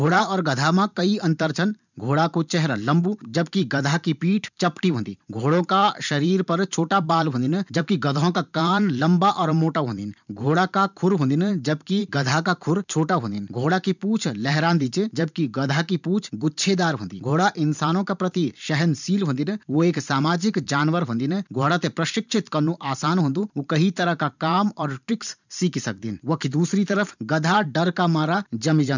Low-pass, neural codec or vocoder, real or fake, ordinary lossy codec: 7.2 kHz; vocoder, 44.1 kHz, 128 mel bands every 512 samples, BigVGAN v2; fake; none